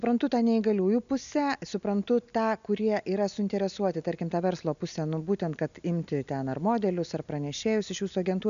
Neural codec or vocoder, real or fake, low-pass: none; real; 7.2 kHz